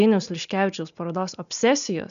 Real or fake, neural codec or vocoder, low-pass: real; none; 7.2 kHz